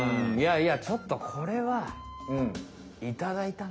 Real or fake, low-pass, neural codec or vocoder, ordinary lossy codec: real; none; none; none